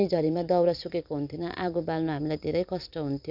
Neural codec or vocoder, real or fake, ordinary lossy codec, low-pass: vocoder, 44.1 kHz, 128 mel bands every 256 samples, BigVGAN v2; fake; none; 5.4 kHz